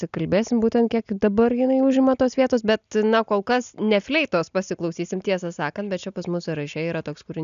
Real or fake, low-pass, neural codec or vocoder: real; 7.2 kHz; none